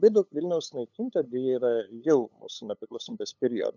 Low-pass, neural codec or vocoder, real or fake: 7.2 kHz; codec, 16 kHz, 8 kbps, FunCodec, trained on LibriTTS, 25 frames a second; fake